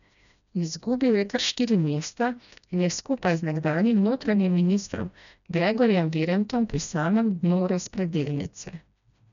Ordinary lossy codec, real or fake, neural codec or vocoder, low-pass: none; fake; codec, 16 kHz, 1 kbps, FreqCodec, smaller model; 7.2 kHz